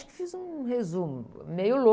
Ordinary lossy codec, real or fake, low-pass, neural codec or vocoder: none; real; none; none